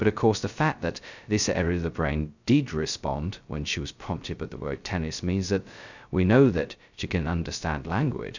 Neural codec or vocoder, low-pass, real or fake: codec, 16 kHz, 0.2 kbps, FocalCodec; 7.2 kHz; fake